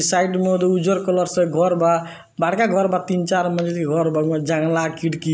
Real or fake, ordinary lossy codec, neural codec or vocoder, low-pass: real; none; none; none